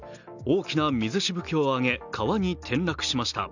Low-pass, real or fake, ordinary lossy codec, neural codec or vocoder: 7.2 kHz; real; none; none